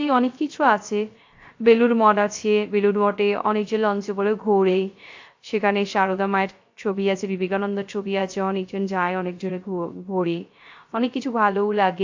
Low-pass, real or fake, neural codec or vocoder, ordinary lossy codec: 7.2 kHz; fake; codec, 16 kHz, 0.3 kbps, FocalCodec; AAC, 48 kbps